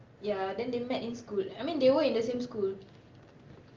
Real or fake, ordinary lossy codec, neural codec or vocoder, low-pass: real; Opus, 32 kbps; none; 7.2 kHz